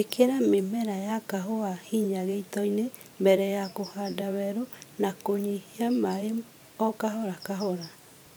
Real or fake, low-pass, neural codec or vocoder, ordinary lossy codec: fake; none; vocoder, 44.1 kHz, 128 mel bands every 512 samples, BigVGAN v2; none